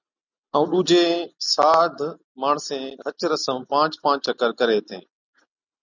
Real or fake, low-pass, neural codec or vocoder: real; 7.2 kHz; none